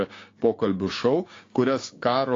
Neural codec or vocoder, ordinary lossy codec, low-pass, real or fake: codec, 16 kHz, 6 kbps, DAC; AAC, 32 kbps; 7.2 kHz; fake